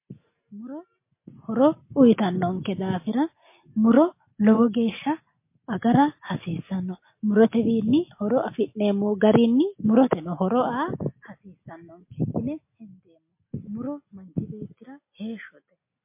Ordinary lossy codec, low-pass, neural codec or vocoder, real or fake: MP3, 24 kbps; 3.6 kHz; none; real